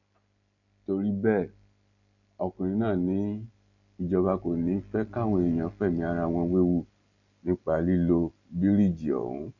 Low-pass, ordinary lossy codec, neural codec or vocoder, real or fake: 7.2 kHz; none; none; real